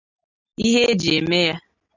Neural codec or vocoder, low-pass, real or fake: none; 7.2 kHz; real